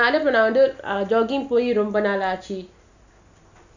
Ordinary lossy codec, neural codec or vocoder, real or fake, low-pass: none; none; real; 7.2 kHz